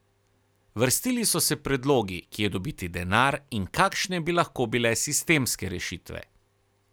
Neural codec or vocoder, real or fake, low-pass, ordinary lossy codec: none; real; none; none